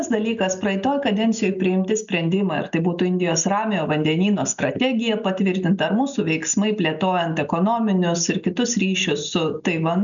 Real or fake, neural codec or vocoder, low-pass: real; none; 7.2 kHz